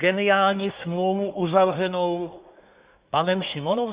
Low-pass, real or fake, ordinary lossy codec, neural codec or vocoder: 3.6 kHz; fake; Opus, 64 kbps; codec, 24 kHz, 1 kbps, SNAC